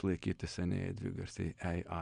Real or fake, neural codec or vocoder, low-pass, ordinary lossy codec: real; none; 9.9 kHz; MP3, 96 kbps